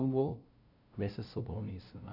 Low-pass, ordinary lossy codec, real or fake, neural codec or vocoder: 5.4 kHz; none; fake; codec, 16 kHz, 0.5 kbps, FunCodec, trained on LibriTTS, 25 frames a second